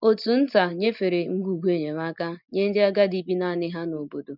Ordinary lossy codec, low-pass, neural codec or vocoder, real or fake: none; 5.4 kHz; none; real